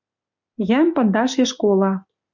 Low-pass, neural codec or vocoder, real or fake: 7.2 kHz; none; real